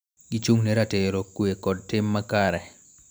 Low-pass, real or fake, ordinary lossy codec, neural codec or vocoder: none; real; none; none